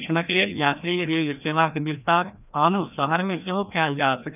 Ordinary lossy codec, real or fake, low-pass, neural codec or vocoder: none; fake; 3.6 kHz; codec, 16 kHz, 1 kbps, FreqCodec, larger model